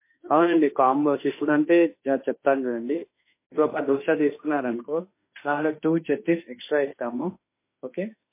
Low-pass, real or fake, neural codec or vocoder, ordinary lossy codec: 3.6 kHz; fake; autoencoder, 48 kHz, 32 numbers a frame, DAC-VAE, trained on Japanese speech; MP3, 24 kbps